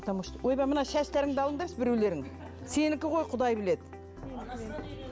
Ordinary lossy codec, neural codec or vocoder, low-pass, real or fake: none; none; none; real